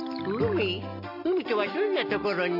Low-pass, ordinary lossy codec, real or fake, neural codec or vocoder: 5.4 kHz; none; real; none